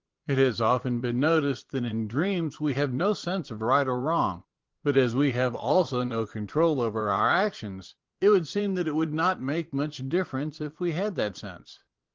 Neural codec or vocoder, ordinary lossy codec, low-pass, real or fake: vocoder, 22.05 kHz, 80 mel bands, Vocos; Opus, 16 kbps; 7.2 kHz; fake